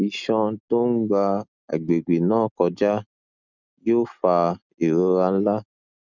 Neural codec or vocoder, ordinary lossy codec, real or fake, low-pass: none; none; real; 7.2 kHz